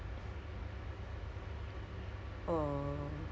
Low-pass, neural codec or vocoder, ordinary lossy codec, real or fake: none; none; none; real